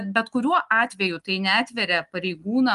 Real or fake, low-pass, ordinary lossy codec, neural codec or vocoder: real; 10.8 kHz; Opus, 32 kbps; none